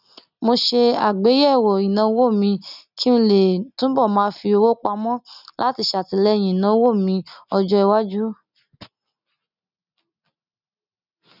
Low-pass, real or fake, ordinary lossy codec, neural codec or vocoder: 5.4 kHz; real; none; none